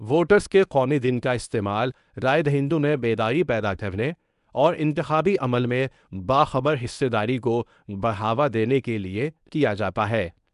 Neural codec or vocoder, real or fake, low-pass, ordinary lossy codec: codec, 24 kHz, 0.9 kbps, WavTokenizer, medium speech release version 1; fake; 10.8 kHz; none